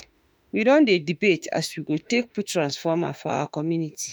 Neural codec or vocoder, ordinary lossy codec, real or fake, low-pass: autoencoder, 48 kHz, 32 numbers a frame, DAC-VAE, trained on Japanese speech; none; fake; none